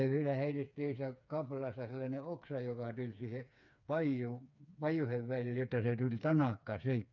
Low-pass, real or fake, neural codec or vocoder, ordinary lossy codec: 7.2 kHz; fake; codec, 16 kHz, 4 kbps, FreqCodec, smaller model; none